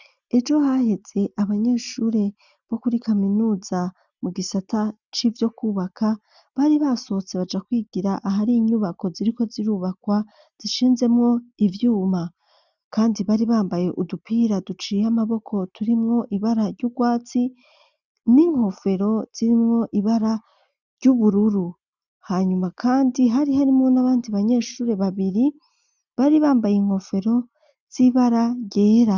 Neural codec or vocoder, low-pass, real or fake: none; 7.2 kHz; real